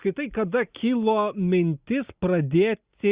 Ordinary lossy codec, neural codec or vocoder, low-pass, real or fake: Opus, 64 kbps; none; 3.6 kHz; real